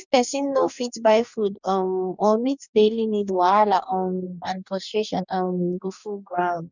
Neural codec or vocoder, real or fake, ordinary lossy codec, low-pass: codec, 44.1 kHz, 2.6 kbps, DAC; fake; none; 7.2 kHz